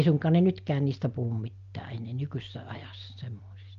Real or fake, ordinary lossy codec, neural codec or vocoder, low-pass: real; Opus, 24 kbps; none; 7.2 kHz